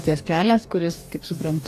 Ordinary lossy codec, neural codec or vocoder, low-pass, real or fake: AAC, 64 kbps; codec, 44.1 kHz, 2.6 kbps, DAC; 14.4 kHz; fake